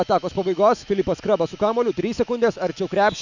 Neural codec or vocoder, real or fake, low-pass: autoencoder, 48 kHz, 128 numbers a frame, DAC-VAE, trained on Japanese speech; fake; 7.2 kHz